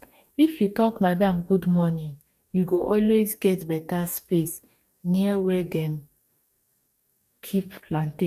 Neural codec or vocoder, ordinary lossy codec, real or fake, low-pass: codec, 44.1 kHz, 2.6 kbps, DAC; none; fake; 14.4 kHz